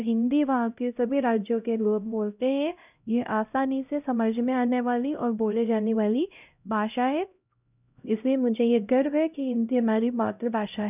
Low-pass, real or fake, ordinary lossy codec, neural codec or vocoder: 3.6 kHz; fake; none; codec, 16 kHz, 0.5 kbps, X-Codec, HuBERT features, trained on LibriSpeech